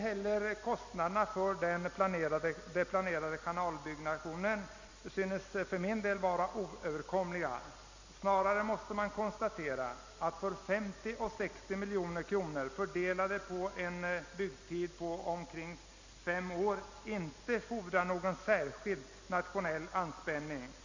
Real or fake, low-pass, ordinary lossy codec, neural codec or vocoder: real; 7.2 kHz; Opus, 64 kbps; none